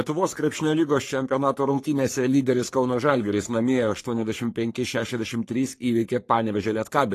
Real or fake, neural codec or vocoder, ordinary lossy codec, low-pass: fake; codec, 44.1 kHz, 3.4 kbps, Pupu-Codec; AAC, 48 kbps; 14.4 kHz